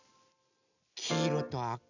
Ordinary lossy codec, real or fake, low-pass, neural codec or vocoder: none; real; 7.2 kHz; none